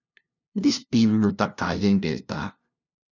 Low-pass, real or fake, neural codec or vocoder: 7.2 kHz; fake; codec, 16 kHz, 0.5 kbps, FunCodec, trained on LibriTTS, 25 frames a second